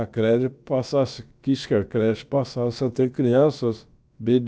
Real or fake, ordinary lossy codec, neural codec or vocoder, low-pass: fake; none; codec, 16 kHz, about 1 kbps, DyCAST, with the encoder's durations; none